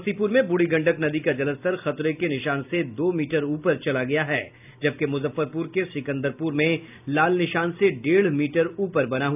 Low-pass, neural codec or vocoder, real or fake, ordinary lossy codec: 3.6 kHz; none; real; none